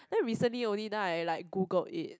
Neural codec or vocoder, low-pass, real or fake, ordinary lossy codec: none; none; real; none